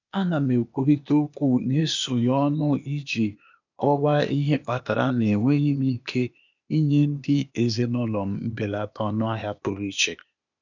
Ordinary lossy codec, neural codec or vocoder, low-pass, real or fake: none; codec, 16 kHz, 0.8 kbps, ZipCodec; 7.2 kHz; fake